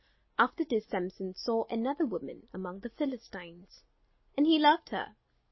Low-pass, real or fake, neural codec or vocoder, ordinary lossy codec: 7.2 kHz; real; none; MP3, 24 kbps